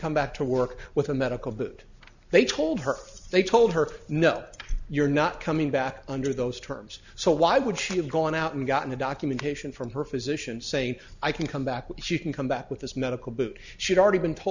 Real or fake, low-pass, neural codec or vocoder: real; 7.2 kHz; none